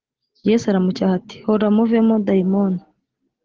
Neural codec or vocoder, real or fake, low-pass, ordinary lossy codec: none; real; 7.2 kHz; Opus, 16 kbps